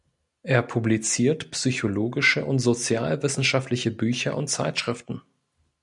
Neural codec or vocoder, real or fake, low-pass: none; real; 10.8 kHz